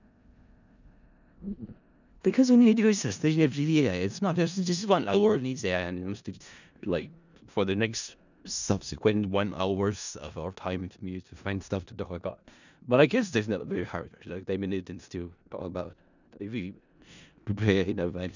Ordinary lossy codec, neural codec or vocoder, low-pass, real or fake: none; codec, 16 kHz in and 24 kHz out, 0.4 kbps, LongCat-Audio-Codec, four codebook decoder; 7.2 kHz; fake